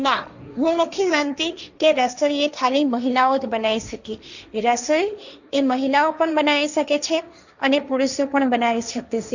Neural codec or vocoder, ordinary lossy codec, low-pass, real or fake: codec, 16 kHz, 1.1 kbps, Voila-Tokenizer; none; 7.2 kHz; fake